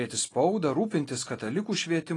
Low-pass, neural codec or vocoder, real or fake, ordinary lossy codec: 10.8 kHz; none; real; AAC, 32 kbps